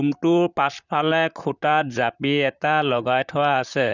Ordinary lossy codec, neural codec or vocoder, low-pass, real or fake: none; vocoder, 44.1 kHz, 128 mel bands, Pupu-Vocoder; 7.2 kHz; fake